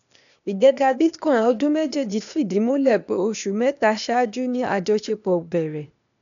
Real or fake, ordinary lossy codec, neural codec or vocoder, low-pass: fake; none; codec, 16 kHz, 0.8 kbps, ZipCodec; 7.2 kHz